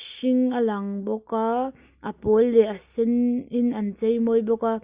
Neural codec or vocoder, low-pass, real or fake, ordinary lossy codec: none; 3.6 kHz; real; Opus, 64 kbps